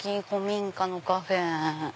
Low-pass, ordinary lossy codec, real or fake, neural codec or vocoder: none; none; real; none